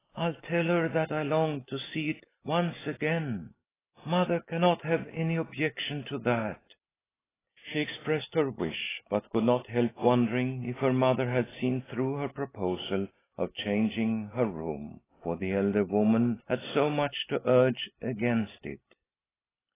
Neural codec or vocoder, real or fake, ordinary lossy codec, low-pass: none; real; AAC, 16 kbps; 3.6 kHz